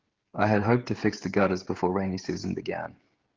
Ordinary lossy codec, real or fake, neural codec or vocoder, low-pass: Opus, 16 kbps; fake; codec, 16 kHz, 16 kbps, FreqCodec, smaller model; 7.2 kHz